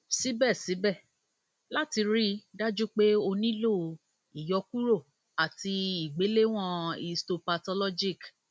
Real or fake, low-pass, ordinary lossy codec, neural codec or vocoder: real; none; none; none